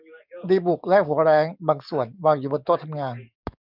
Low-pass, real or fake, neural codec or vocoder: 5.4 kHz; fake; codec, 44.1 kHz, 7.8 kbps, DAC